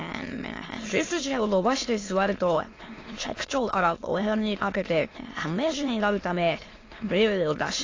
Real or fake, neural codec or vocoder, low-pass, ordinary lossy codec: fake; autoencoder, 22.05 kHz, a latent of 192 numbers a frame, VITS, trained on many speakers; 7.2 kHz; AAC, 32 kbps